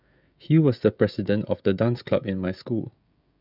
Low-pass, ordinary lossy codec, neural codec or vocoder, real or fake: 5.4 kHz; none; codec, 16 kHz, 16 kbps, FreqCodec, smaller model; fake